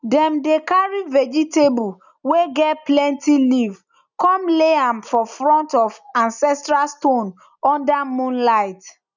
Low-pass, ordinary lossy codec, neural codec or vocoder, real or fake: 7.2 kHz; none; none; real